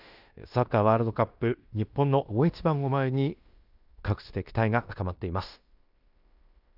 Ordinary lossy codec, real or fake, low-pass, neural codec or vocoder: none; fake; 5.4 kHz; codec, 16 kHz in and 24 kHz out, 0.9 kbps, LongCat-Audio-Codec, fine tuned four codebook decoder